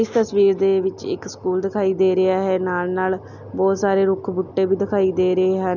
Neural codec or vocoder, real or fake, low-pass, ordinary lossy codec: none; real; 7.2 kHz; none